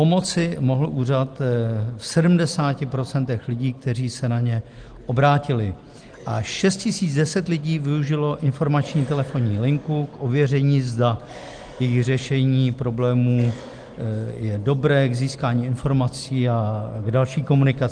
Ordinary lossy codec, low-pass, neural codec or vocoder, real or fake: Opus, 24 kbps; 9.9 kHz; none; real